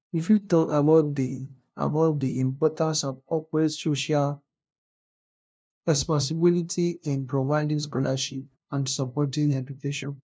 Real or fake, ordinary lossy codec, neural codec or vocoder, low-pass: fake; none; codec, 16 kHz, 0.5 kbps, FunCodec, trained on LibriTTS, 25 frames a second; none